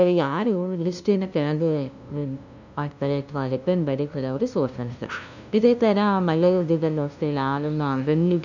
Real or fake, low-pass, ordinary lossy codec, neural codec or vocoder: fake; 7.2 kHz; none; codec, 16 kHz, 0.5 kbps, FunCodec, trained on LibriTTS, 25 frames a second